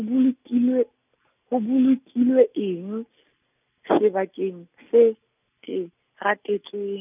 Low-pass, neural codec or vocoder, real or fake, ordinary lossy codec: 3.6 kHz; none; real; none